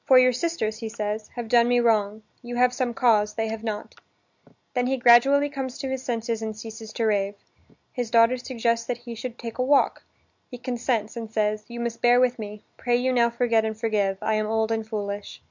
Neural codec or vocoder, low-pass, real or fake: none; 7.2 kHz; real